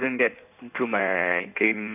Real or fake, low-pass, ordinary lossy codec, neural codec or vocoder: fake; 3.6 kHz; none; codec, 16 kHz in and 24 kHz out, 1.1 kbps, FireRedTTS-2 codec